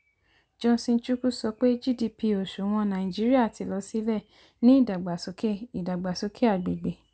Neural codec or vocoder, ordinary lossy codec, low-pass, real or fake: none; none; none; real